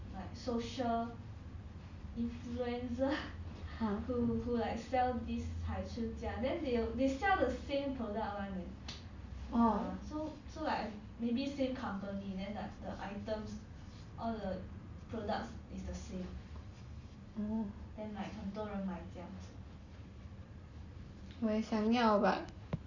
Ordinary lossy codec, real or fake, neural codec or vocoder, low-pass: Opus, 64 kbps; real; none; 7.2 kHz